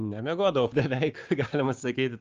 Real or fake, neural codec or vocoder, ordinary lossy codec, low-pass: fake; codec, 16 kHz, 6 kbps, DAC; Opus, 24 kbps; 7.2 kHz